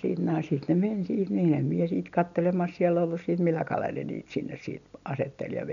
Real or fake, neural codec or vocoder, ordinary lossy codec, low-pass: real; none; none; 7.2 kHz